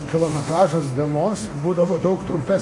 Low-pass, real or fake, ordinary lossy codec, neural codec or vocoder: 10.8 kHz; fake; MP3, 48 kbps; codec, 24 kHz, 0.9 kbps, DualCodec